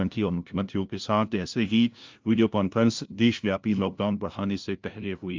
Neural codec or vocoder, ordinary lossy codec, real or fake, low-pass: codec, 16 kHz, 0.5 kbps, FunCodec, trained on LibriTTS, 25 frames a second; Opus, 24 kbps; fake; 7.2 kHz